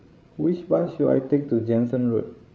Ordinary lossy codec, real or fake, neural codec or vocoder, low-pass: none; fake; codec, 16 kHz, 16 kbps, FreqCodec, larger model; none